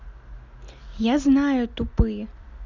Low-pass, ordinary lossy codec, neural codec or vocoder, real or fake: 7.2 kHz; none; none; real